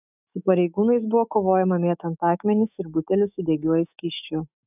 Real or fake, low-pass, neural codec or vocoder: fake; 3.6 kHz; autoencoder, 48 kHz, 128 numbers a frame, DAC-VAE, trained on Japanese speech